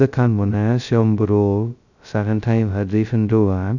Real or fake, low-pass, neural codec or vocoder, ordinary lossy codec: fake; 7.2 kHz; codec, 16 kHz, 0.2 kbps, FocalCodec; none